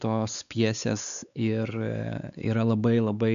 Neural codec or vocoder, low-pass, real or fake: codec, 16 kHz, 4 kbps, X-Codec, WavLM features, trained on Multilingual LibriSpeech; 7.2 kHz; fake